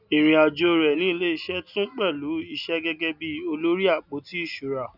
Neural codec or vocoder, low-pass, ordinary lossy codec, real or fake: none; 5.4 kHz; none; real